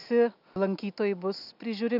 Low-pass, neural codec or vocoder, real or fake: 5.4 kHz; none; real